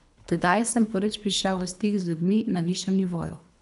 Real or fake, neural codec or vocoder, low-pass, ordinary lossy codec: fake; codec, 24 kHz, 3 kbps, HILCodec; 10.8 kHz; none